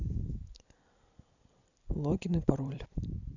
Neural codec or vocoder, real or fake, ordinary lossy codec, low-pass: vocoder, 44.1 kHz, 128 mel bands every 256 samples, BigVGAN v2; fake; none; 7.2 kHz